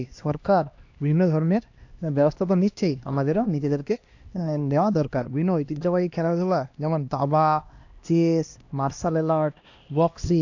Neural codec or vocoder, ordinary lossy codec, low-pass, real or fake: codec, 16 kHz, 1 kbps, X-Codec, HuBERT features, trained on LibriSpeech; AAC, 48 kbps; 7.2 kHz; fake